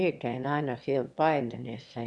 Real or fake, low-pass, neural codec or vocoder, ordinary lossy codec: fake; none; autoencoder, 22.05 kHz, a latent of 192 numbers a frame, VITS, trained on one speaker; none